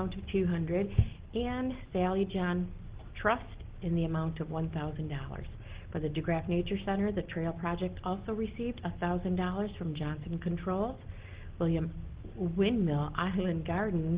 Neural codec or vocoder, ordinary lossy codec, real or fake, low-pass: none; Opus, 16 kbps; real; 3.6 kHz